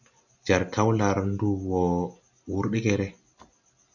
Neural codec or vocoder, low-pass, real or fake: none; 7.2 kHz; real